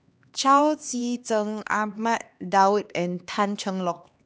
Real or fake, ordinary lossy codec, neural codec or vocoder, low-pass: fake; none; codec, 16 kHz, 2 kbps, X-Codec, HuBERT features, trained on LibriSpeech; none